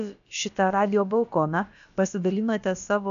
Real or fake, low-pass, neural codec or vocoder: fake; 7.2 kHz; codec, 16 kHz, about 1 kbps, DyCAST, with the encoder's durations